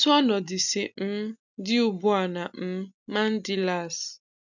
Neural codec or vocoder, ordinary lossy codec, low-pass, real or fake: none; none; 7.2 kHz; real